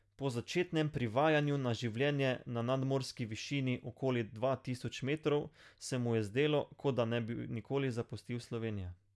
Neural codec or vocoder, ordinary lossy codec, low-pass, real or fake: none; none; none; real